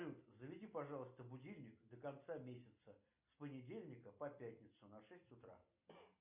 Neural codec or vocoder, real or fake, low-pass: none; real; 3.6 kHz